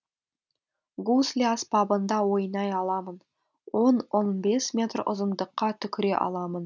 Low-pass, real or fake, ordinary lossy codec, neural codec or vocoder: 7.2 kHz; real; none; none